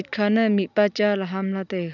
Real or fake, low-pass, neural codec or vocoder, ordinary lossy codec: real; 7.2 kHz; none; none